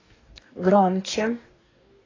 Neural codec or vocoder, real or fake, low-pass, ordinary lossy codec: codec, 44.1 kHz, 2.6 kbps, DAC; fake; 7.2 kHz; AAC, 32 kbps